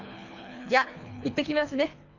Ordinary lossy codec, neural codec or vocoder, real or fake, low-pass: none; codec, 24 kHz, 3 kbps, HILCodec; fake; 7.2 kHz